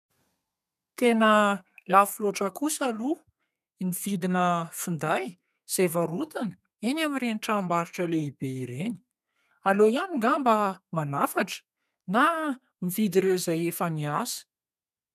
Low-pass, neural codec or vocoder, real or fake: 14.4 kHz; codec, 32 kHz, 1.9 kbps, SNAC; fake